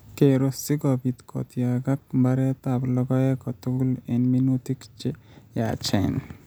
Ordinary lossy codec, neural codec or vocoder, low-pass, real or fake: none; none; none; real